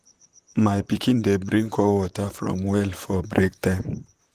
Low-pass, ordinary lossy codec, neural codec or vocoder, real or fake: 14.4 kHz; Opus, 24 kbps; vocoder, 44.1 kHz, 128 mel bands, Pupu-Vocoder; fake